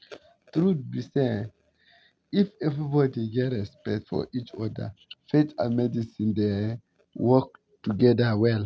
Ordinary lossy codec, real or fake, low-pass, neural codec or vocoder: none; real; none; none